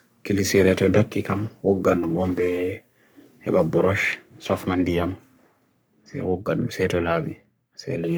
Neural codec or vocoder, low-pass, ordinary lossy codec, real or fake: codec, 44.1 kHz, 3.4 kbps, Pupu-Codec; none; none; fake